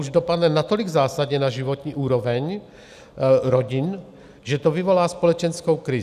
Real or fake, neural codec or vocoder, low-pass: real; none; 14.4 kHz